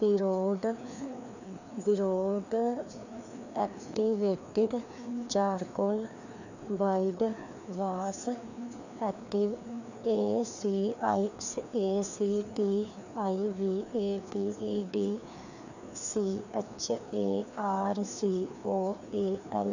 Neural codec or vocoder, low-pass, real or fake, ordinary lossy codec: codec, 16 kHz, 2 kbps, FreqCodec, larger model; 7.2 kHz; fake; none